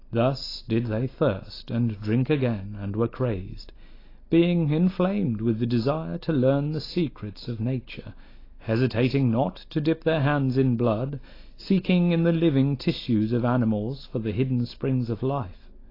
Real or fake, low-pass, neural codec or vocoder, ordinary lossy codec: real; 5.4 kHz; none; AAC, 24 kbps